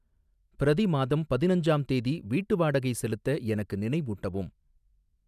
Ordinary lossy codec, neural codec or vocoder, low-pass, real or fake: none; none; 14.4 kHz; real